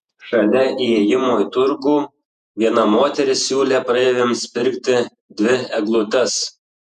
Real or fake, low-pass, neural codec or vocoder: fake; 14.4 kHz; vocoder, 48 kHz, 128 mel bands, Vocos